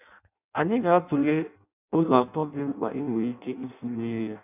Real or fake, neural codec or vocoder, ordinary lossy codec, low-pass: fake; codec, 16 kHz in and 24 kHz out, 0.6 kbps, FireRedTTS-2 codec; none; 3.6 kHz